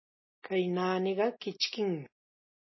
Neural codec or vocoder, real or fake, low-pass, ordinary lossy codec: none; real; 7.2 kHz; MP3, 24 kbps